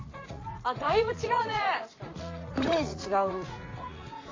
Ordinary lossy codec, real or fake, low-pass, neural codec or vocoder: AAC, 32 kbps; fake; 7.2 kHz; vocoder, 44.1 kHz, 80 mel bands, Vocos